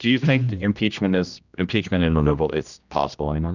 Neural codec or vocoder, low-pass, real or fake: codec, 16 kHz, 1 kbps, X-Codec, HuBERT features, trained on general audio; 7.2 kHz; fake